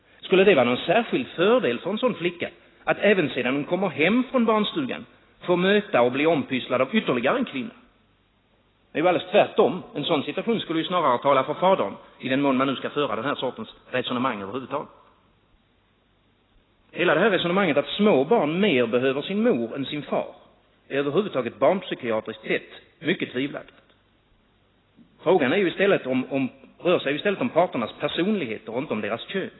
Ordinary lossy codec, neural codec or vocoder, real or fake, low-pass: AAC, 16 kbps; none; real; 7.2 kHz